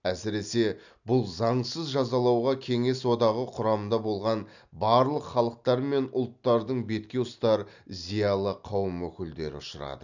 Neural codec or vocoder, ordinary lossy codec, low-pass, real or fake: none; none; 7.2 kHz; real